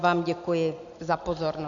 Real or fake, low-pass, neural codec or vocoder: real; 7.2 kHz; none